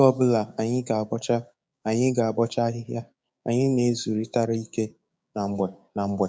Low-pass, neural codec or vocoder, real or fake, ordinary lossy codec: none; codec, 16 kHz, 6 kbps, DAC; fake; none